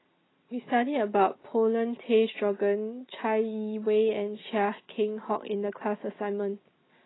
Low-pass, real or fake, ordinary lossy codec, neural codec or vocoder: 7.2 kHz; real; AAC, 16 kbps; none